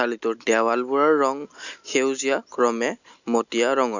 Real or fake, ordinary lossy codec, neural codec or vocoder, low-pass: real; none; none; 7.2 kHz